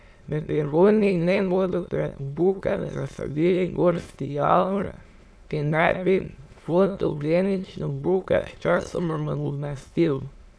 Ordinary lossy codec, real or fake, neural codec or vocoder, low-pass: none; fake; autoencoder, 22.05 kHz, a latent of 192 numbers a frame, VITS, trained on many speakers; none